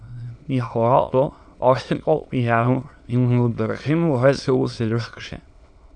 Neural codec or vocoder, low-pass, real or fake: autoencoder, 22.05 kHz, a latent of 192 numbers a frame, VITS, trained on many speakers; 9.9 kHz; fake